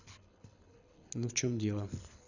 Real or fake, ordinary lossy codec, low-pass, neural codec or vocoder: fake; none; 7.2 kHz; codec, 24 kHz, 6 kbps, HILCodec